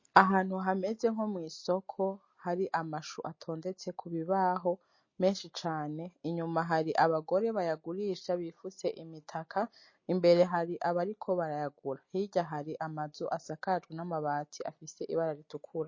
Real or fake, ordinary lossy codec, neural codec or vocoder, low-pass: real; MP3, 32 kbps; none; 7.2 kHz